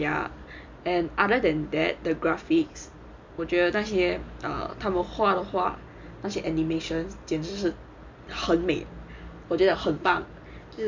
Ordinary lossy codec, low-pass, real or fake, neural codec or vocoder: MP3, 64 kbps; 7.2 kHz; real; none